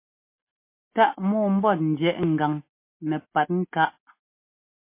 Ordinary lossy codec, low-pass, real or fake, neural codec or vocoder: MP3, 24 kbps; 3.6 kHz; real; none